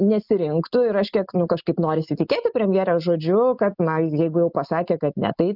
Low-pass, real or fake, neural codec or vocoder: 5.4 kHz; real; none